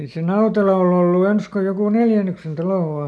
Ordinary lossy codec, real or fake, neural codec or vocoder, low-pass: none; real; none; none